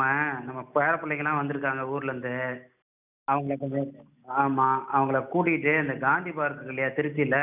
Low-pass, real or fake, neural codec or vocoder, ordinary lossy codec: 3.6 kHz; real; none; none